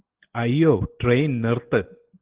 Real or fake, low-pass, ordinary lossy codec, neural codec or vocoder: real; 3.6 kHz; Opus, 16 kbps; none